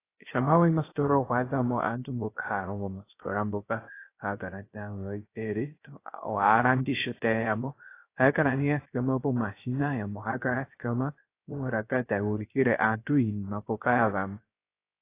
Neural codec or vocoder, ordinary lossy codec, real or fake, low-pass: codec, 16 kHz, 0.3 kbps, FocalCodec; AAC, 24 kbps; fake; 3.6 kHz